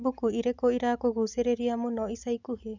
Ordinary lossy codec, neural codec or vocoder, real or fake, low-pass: none; none; real; 7.2 kHz